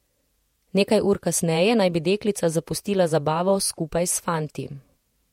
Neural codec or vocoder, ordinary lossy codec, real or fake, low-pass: vocoder, 48 kHz, 128 mel bands, Vocos; MP3, 64 kbps; fake; 19.8 kHz